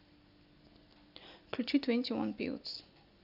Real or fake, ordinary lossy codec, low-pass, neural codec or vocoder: real; none; 5.4 kHz; none